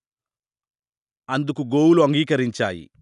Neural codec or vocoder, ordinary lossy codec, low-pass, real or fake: none; none; 10.8 kHz; real